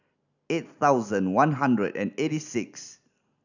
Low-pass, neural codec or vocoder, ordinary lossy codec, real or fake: 7.2 kHz; none; none; real